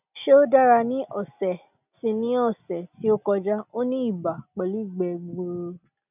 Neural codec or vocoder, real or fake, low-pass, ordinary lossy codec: none; real; 3.6 kHz; none